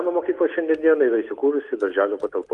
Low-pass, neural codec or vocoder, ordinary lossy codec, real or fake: 10.8 kHz; none; Opus, 32 kbps; real